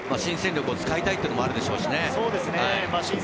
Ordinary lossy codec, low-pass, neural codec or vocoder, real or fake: none; none; none; real